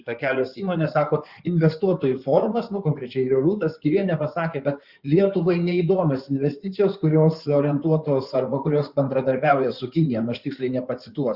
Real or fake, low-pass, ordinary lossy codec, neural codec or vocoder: fake; 5.4 kHz; Opus, 64 kbps; codec, 16 kHz in and 24 kHz out, 2.2 kbps, FireRedTTS-2 codec